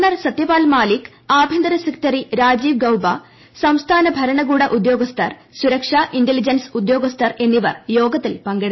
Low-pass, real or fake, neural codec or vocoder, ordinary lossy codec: 7.2 kHz; real; none; MP3, 24 kbps